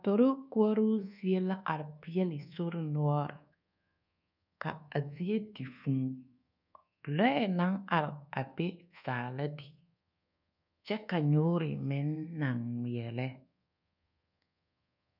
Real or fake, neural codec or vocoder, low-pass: fake; codec, 24 kHz, 1.2 kbps, DualCodec; 5.4 kHz